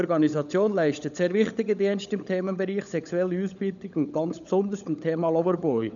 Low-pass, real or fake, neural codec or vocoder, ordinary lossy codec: 7.2 kHz; fake; codec, 16 kHz, 16 kbps, FunCodec, trained on LibriTTS, 50 frames a second; none